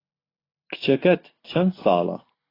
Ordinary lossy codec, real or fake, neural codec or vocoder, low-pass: AAC, 24 kbps; real; none; 5.4 kHz